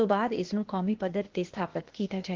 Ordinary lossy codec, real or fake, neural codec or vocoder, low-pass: Opus, 16 kbps; fake; codec, 16 kHz, 0.8 kbps, ZipCodec; 7.2 kHz